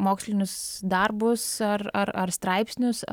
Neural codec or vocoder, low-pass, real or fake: none; 19.8 kHz; real